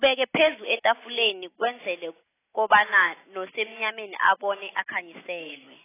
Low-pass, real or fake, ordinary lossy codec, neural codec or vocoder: 3.6 kHz; real; AAC, 16 kbps; none